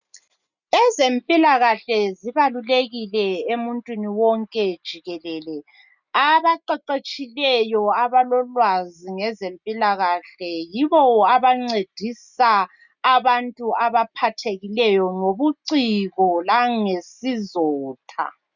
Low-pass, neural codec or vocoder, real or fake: 7.2 kHz; none; real